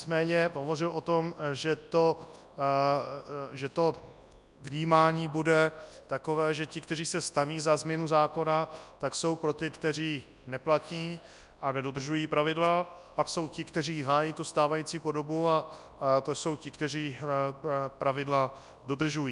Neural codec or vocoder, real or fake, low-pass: codec, 24 kHz, 0.9 kbps, WavTokenizer, large speech release; fake; 10.8 kHz